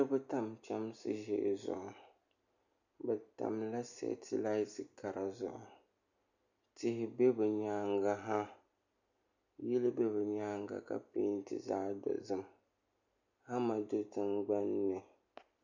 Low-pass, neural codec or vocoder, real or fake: 7.2 kHz; none; real